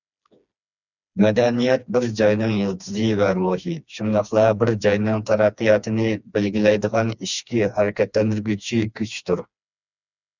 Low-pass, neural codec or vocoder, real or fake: 7.2 kHz; codec, 16 kHz, 2 kbps, FreqCodec, smaller model; fake